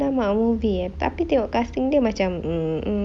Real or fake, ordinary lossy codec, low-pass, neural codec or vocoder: real; none; none; none